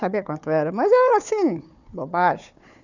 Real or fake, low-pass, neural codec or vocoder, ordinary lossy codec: fake; 7.2 kHz; codec, 16 kHz, 4 kbps, FunCodec, trained on Chinese and English, 50 frames a second; none